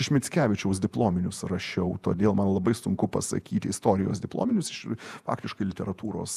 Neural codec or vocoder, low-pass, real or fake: vocoder, 48 kHz, 128 mel bands, Vocos; 14.4 kHz; fake